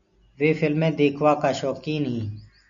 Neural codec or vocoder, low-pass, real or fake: none; 7.2 kHz; real